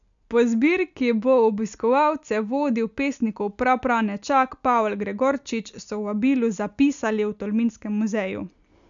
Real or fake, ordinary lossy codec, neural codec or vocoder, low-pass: real; none; none; 7.2 kHz